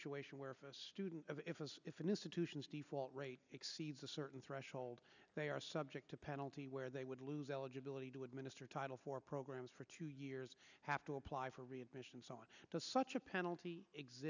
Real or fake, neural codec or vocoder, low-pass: real; none; 7.2 kHz